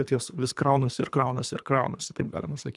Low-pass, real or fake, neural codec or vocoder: 10.8 kHz; fake; codec, 24 kHz, 3 kbps, HILCodec